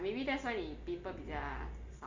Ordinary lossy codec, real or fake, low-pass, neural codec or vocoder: AAC, 48 kbps; real; 7.2 kHz; none